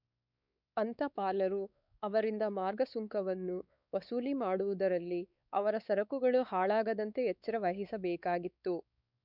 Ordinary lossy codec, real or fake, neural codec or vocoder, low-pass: none; fake; codec, 16 kHz, 4 kbps, X-Codec, WavLM features, trained on Multilingual LibriSpeech; 5.4 kHz